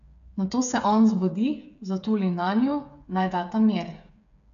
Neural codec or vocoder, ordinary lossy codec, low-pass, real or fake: codec, 16 kHz, 8 kbps, FreqCodec, smaller model; none; 7.2 kHz; fake